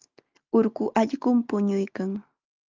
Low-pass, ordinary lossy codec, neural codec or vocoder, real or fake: 7.2 kHz; Opus, 24 kbps; none; real